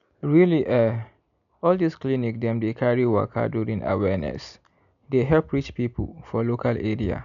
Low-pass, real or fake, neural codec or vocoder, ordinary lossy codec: 7.2 kHz; real; none; none